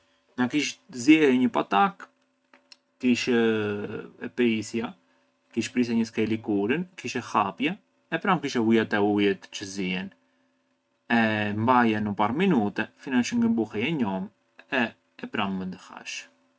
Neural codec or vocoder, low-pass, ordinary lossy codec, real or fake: none; none; none; real